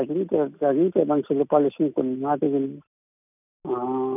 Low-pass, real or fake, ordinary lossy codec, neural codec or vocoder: 3.6 kHz; real; none; none